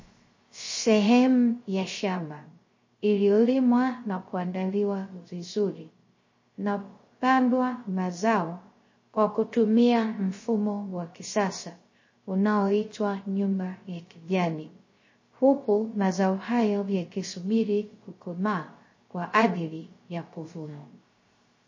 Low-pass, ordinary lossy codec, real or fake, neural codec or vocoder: 7.2 kHz; MP3, 32 kbps; fake; codec, 16 kHz, 0.3 kbps, FocalCodec